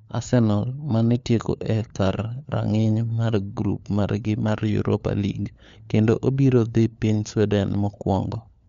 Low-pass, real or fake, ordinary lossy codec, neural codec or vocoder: 7.2 kHz; fake; MP3, 64 kbps; codec, 16 kHz, 4 kbps, FunCodec, trained on LibriTTS, 50 frames a second